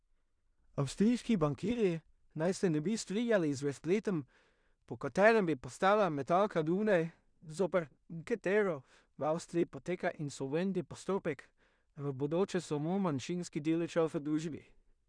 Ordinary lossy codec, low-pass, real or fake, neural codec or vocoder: none; 9.9 kHz; fake; codec, 16 kHz in and 24 kHz out, 0.4 kbps, LongCat-Audio-Codec, two codebook decoder